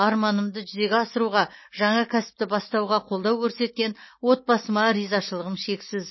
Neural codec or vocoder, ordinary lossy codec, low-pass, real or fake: none; MP3, 24 kbps; 7.2 kHz; real